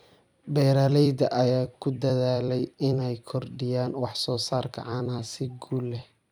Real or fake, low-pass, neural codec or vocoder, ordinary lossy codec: fake; 19.8 kHz; vocoder, 44.1 kHz, 128 mel bands every 256 samples, BigVGAN v2; none